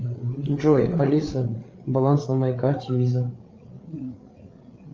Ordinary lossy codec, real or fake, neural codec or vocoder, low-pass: Opus, 24 kbps; fake; codec, 16 kHz, 8 kbps, FreqCodec, larger model; 7.2 kHz